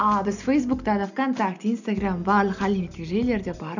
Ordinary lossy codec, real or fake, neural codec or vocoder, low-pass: none; real; none; 7.2 kHz